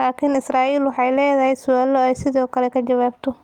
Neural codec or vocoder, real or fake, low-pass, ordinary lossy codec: none; real; 19.8 kHz; Opus, 24 kbps